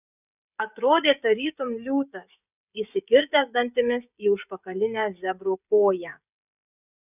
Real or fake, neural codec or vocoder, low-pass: fake; codec, 16 kHz, 16 kbps, FreqCodec, smaller model; 3.6 kHz